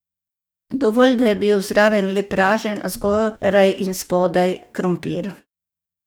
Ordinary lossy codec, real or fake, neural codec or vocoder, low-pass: none; fake; codec, 44.1 kHz, 2.6 kbps, DAC; none